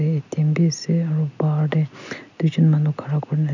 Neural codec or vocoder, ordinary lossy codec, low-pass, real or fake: none; none; 7.2 kHz; real